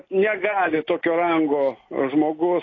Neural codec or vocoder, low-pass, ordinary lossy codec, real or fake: none; 7.2 kHz; AAC, 32 kbps; real